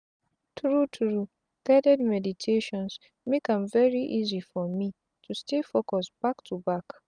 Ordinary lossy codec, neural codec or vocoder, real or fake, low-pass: none; none; real; 9.9 kHz